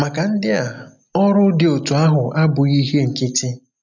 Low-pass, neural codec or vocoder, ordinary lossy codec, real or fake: 7.2 kHz; none; none; real